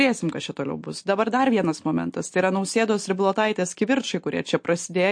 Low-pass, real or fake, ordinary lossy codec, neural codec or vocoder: 9.9 kHz; real; MP3, 48 kbps; none